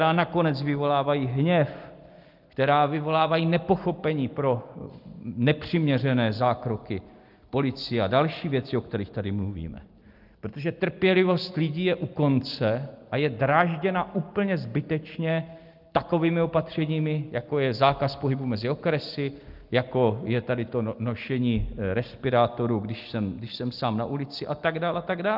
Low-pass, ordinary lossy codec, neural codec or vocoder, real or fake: 5.4 kHz; Opus, 24 kbps; none; real